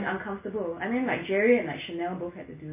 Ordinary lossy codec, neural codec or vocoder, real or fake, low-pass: MP3, 16 kbps; none; real; 3.6 kHz